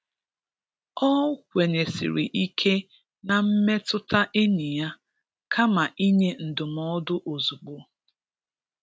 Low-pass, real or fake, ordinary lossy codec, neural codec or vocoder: none; real; none; none